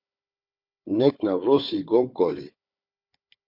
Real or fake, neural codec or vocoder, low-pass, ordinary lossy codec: fake; codec, 16 kHz, 4 kbps, FunCodec, trained on Chinese and English, 50 frames a second; 5.4 kHz; MP3, 48 kbps